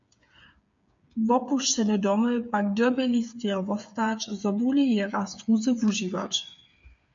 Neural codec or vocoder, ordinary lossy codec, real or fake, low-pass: codec, 16 kHz, 8 kbps, FreqCodec, smaller model; MP3, 64 kbps; fake; 7.2 kHz